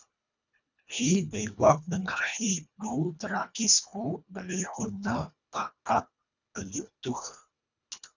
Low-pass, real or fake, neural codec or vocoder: 7.2 kHz; fake; codec, 24 kHz, 1.5 kbps, HILCodec